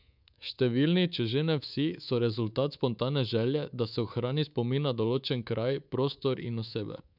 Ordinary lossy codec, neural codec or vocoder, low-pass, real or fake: none; codec, 24 kHz, 3.1 kbps, DualCodec; 5.4 kHz; fake